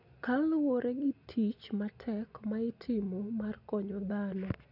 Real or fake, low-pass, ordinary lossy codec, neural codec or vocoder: real; 5.4 kHz; none; none